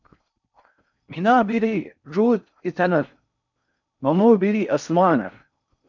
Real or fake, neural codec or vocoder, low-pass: fake; codec, 16 kHz in and 24 kHz out, 0.6 kbps, FocalCodec, streaming, 4096 codes; 7.2 kHz